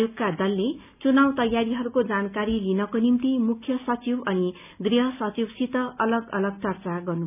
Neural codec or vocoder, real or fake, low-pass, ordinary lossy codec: none; real; 3.6 kHz; none